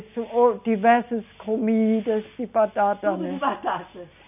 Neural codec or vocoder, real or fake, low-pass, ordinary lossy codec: none; real; 3.6 kHz; none